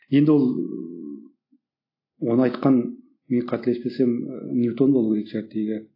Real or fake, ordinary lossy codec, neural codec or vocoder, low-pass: real; AAC, 32 kbps; none; 5.4 kHz